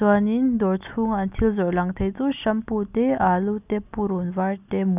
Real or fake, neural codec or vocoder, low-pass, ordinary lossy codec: real; none; 3.6 kHz; none